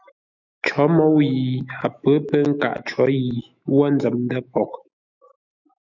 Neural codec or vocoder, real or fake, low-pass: autoencoder, 48 kHz, 128 numbers a frame, DAC-VAE, trained on Japanese speech; fake; 7.2 kHz